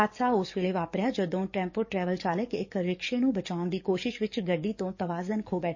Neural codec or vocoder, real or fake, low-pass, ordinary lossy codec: codec, 44.1 kHz, 7.8 kbps, DAC; fake; 7.2 kHz; MP3, 32 kbps